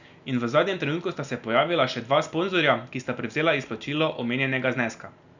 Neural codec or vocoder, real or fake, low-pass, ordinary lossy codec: none; real; 7.2 kHz; none